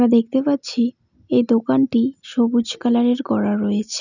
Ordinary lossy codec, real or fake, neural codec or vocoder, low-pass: none; real; none; 7.2 kHz